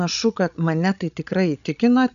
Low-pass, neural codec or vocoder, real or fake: 7.2 kHz; codec, 16 kHz, 16 kbps, FreqCodec, larger model; fake